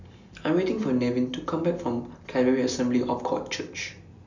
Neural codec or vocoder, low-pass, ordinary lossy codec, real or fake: none; 7.2 kHz; none; real